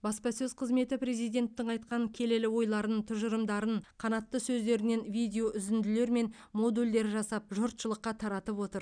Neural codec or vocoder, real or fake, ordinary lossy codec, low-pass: none; real; none; 9.9 kHz